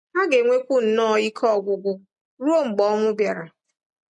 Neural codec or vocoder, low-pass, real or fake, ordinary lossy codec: none; 10.8 kHz; real; MP3, 48 kbps